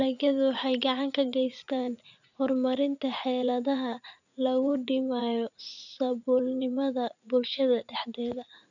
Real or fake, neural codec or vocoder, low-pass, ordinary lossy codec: fake; vocoder, 44.1 kHz, 80 mel bands, Vocos; 7.2 kHz; none